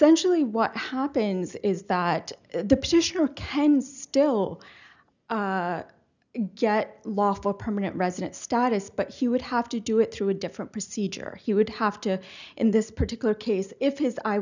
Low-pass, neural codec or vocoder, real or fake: 7.2 kHz; none; real